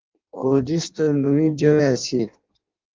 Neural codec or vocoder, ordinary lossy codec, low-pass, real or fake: codec, 16 kHz in and 24 kHz out, 0.6 kbps, FireRedTTS-2 codec; Opus, 24 kbps; 7.2 kHz; fake